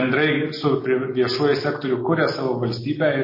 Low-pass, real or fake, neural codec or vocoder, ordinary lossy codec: 5.4 kHz; real; none; MP3, 24 kbps